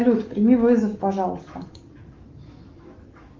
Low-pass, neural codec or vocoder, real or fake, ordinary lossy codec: 7.2 kHz; autoencoder, 48 kHz, 128 numbers a frame, DAC-VAE, trained on Japanese speech; fake; Opus, 32 kbps